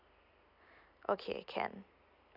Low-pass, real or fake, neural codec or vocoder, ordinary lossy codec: 5.4 kHz; real; none; none